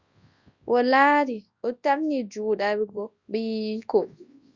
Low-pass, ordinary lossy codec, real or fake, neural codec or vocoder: 7.2 kHz; Opus, 64 kbps; fake; codec, 24 kHz, 0.9 kbps, WavTokenizer, large speech release